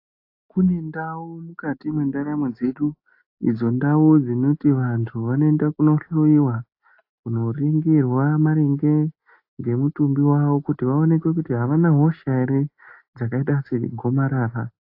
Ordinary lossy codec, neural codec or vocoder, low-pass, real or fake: AAC, 32 kbps; none; 5.4 kHz; real